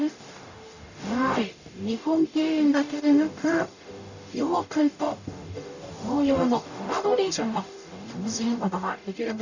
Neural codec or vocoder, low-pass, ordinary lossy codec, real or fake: codec, 44.1 kHz, 0.9 kbps, DAC; 7.2 kHz; none; fake